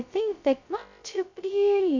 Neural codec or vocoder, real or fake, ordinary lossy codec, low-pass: codec, 16 kHz, 0.2 kbps, FocalCodec; fake; MP3, 64 kbps; 7.2 kHz